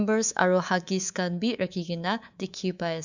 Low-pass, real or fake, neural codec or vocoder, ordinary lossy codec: 7.2 kHz; fake; codec, 24 kHz, 3.1 kbps, DualCodec; none